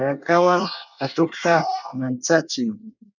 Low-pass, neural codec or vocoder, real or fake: 7.2 kHz; codec, 24 kHz, 1 kbps, SNAC; fake